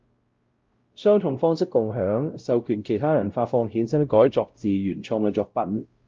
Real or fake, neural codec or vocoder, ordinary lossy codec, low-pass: fake; codec, 16 kHz, 0.5 kbps, X-Codec, WavLM features, trained on Multilingual LibriSpeech; Opus, 24 kbps; 7.2 kHz